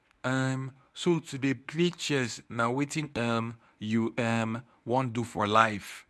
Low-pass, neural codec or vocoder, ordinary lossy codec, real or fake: none; codec, 24 kHz, 0.9 kbps, WavTokenizer, medium speech release version 1; none; fake